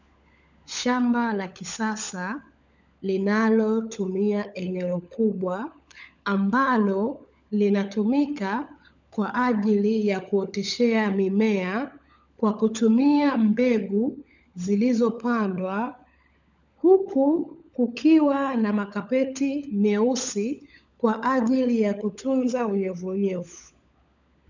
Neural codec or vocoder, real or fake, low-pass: codec, 16 kHz, 16 kbps, FunCodec, trained on LibriTTS, 50 frames a second; fake; 7.2 kHz